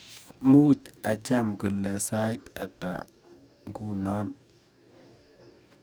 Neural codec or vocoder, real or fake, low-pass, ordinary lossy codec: codec, 44.1 kHz, 2.6 kbps, DAC; fake; none; none